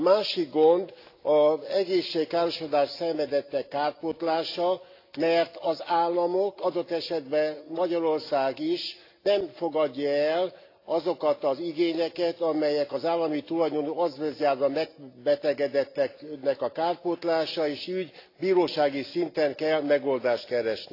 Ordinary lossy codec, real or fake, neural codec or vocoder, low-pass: AAC, 32 kbps; real; none; 5.4 kHz